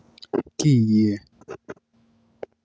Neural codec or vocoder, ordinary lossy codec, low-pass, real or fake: none; none; none; real